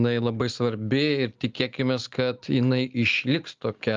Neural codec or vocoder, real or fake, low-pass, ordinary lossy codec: none; real; 7.2 kHz; Opus, 24 kbps